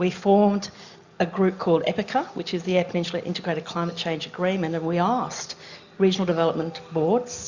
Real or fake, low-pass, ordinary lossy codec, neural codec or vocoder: real; 7.2 kHz; Opus, 64 kbps; none